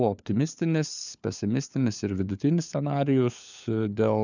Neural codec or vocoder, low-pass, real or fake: codec, 16 kHz, 4 kbps, FunCodec, trained on LibriTTS, 50 frames a second; 7.2 kHz; fake